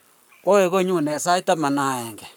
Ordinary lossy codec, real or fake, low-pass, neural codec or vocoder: none; fake; none; codec, 44.1 kHz, 7.8 kbps, Pupu-Codec